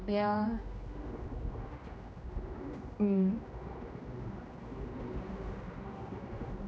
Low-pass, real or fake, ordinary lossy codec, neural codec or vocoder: none; fake; none; codec, 16 kHz, 1 kbps, X-Codec, HuBERT features, trained on general audio